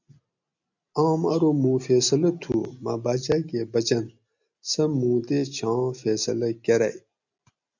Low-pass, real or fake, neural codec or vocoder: 7.2 kHz; real; none